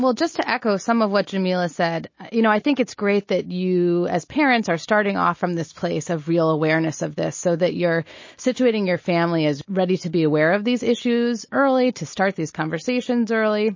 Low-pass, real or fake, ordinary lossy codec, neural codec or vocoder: 7.2 kHz; real; MP3, 32 kbps; none